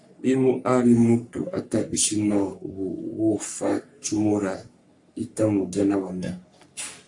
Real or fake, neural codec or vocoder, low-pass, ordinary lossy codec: fake; codec, 44.1 kHz, 3.4 kbps, Pupu-Codec; 10.8 kHz; AAC, 64 kbps